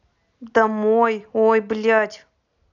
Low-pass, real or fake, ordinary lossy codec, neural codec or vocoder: 7.2 kHz; real; none; none